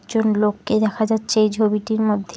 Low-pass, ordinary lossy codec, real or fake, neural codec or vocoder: none; none; real; none